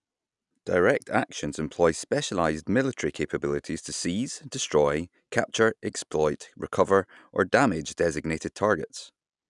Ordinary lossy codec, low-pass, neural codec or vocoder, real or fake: none; 10.8 kHz; none; real